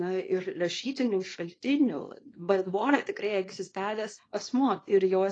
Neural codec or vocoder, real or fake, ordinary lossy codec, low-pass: codec, 24 kHz, 0.9 kbps, WavTokenizer, small release; fake; AAC, 32 kbps; 9.9 kHz